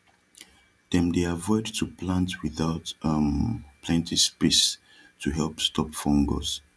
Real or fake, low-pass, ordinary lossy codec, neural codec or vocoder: real; none; none; none